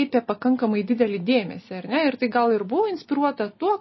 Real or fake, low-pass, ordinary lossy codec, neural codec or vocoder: real; 7.2 kHz; MP3, 24 kbps; none